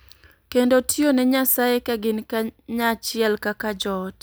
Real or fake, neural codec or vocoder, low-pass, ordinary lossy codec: real; none; none; none